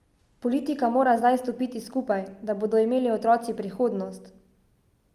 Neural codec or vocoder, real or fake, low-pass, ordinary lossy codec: none; real; 14.4 kHz; Opus, 32 kbps